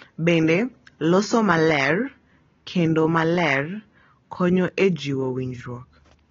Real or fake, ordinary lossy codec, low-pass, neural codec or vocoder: real; AAC, 24 kbps; 7.2 kHz; none